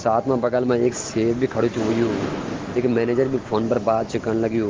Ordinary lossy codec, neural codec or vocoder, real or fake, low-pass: Opus, 16 kbps; none; real; 7.2 kHz